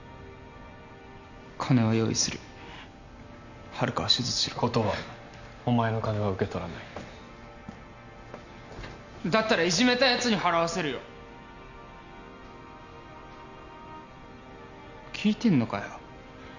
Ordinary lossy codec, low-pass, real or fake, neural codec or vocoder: none; 7.2 kHz; real; none